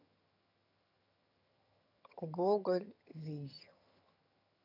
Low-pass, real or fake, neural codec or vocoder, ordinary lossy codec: 5.4 kHz; fake; vocoder, 22.05 kHz, 80 mel bands, HiFi-GAN; none